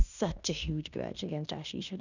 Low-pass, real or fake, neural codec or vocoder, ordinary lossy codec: 7.2 kHz; fake; codec, 16 kHz in and 24 kHz out, 0.9 kbps, LongCat-Audio-Codec, fine tuned four codebook decoder; none